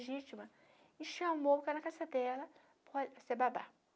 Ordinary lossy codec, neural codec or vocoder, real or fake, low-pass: none; none; real; none